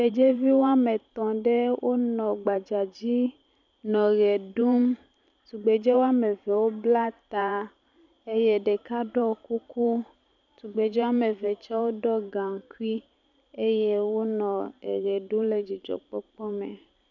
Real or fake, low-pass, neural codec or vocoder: fake; 7.2 kHz; vocoder, 44.1 kHz, 128 mel bands every 512 samples, BigVGAN v2